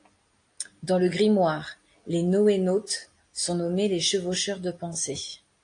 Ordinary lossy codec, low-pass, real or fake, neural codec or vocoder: AAC, 48 kbps; 9.9 kHz; real; none